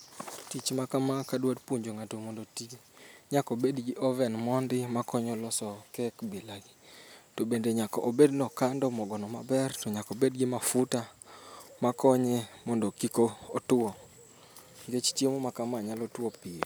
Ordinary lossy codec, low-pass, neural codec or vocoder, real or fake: none; none; none; real